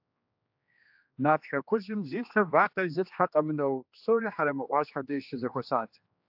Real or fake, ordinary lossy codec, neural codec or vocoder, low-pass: fake; AAC, 48 kbps; codec, 16 kHz, 2 kbps, X-Codec, HuBERT features, trained on general audio; 5.4 kHz